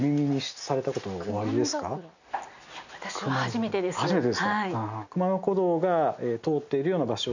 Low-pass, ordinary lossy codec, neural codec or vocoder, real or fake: 7.2 kHz; none; none; real